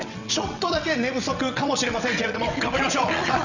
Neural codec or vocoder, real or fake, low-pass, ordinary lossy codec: vocoder, 22.05 kHz, 80 mel bands, WaveNeXt; fake; 7.2 kHz; none